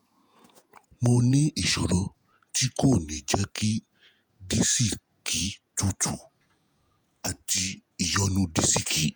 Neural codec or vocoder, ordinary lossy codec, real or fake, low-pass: vocoder, 48 kHz, 128 mel bands, Vocos; none; fake; none